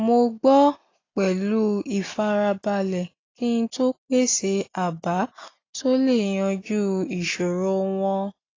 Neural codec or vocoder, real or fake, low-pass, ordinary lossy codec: none; real; 7.2 kHz; AAC, 32 kbps